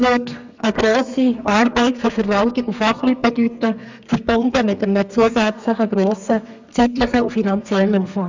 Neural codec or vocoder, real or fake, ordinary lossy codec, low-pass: codec, 32 kHz, 1.9 kbps, SNAC; fake; MP3, 64 kbps; 7.2 kHz